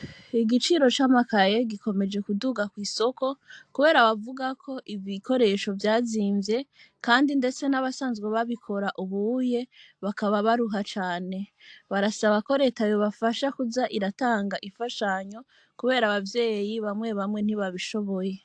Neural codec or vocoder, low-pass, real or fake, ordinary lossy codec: none; 9.9 kHz; real; AAC, 64 kbps